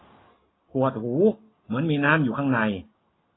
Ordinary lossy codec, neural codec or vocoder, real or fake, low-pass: AAC, 16 kbps; none; real; 7.2 kHz